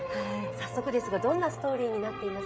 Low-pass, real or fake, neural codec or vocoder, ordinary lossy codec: none; fake; codec, 16 kHz, 16 kbps, FreqCodec, smaller model; none